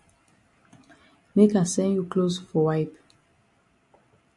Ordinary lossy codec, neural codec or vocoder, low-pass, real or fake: AAC, 64 kbps; none; 10.8 kHz; real